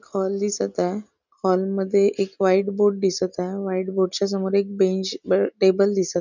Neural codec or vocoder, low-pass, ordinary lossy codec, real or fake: none; 7.2 kHz; none; real